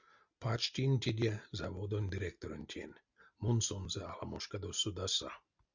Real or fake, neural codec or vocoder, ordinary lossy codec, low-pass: real; none; Opus, 64 kbps; 7.2 kHz